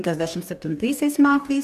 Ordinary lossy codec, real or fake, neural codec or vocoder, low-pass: MP3, 96 kbps; fake; codec, 44.1 kHz, 2.6 kbps, DAC; 14.4 kHz